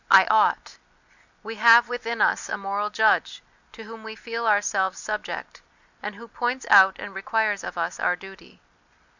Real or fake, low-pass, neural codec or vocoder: real; 7.2 kHz; none